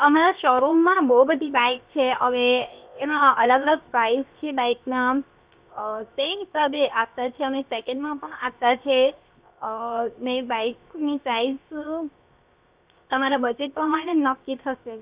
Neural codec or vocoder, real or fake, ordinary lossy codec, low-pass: codec, 16 kHz, 0.7 kbps, FocalCodec; fake; Opus, 64 kbps; 3.6 kHz